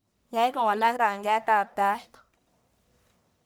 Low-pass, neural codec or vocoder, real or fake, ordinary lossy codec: none; codec, 44.1 kHz, 1.7 kbps, Pupu-Codec; fake; none